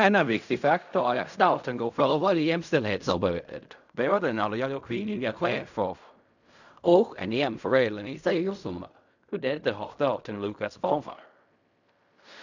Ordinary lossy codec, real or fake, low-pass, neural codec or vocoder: none; fake; 7.2 kHz; codec, 16 kHz in and 24 kHz out, 0.4 kbps, LongCat-Audio-Codec, fine tuned four codebook decoder